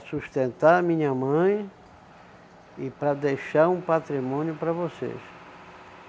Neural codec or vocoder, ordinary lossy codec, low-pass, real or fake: none; none; none; real